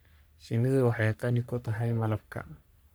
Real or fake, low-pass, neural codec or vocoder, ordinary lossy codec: fake; none; codec, 44.1 kHz, 3.4 kbps, Pupu-Codec; none